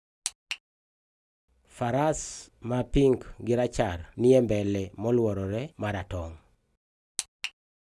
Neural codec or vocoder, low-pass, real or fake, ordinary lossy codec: none; none; real; none